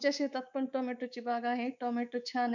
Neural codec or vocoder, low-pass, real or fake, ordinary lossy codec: codec, 24 kHz, 3.1 kbps, DualCodec; 7.2 kHz; fake; none